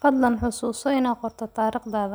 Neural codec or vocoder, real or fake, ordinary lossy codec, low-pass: none; real; none; none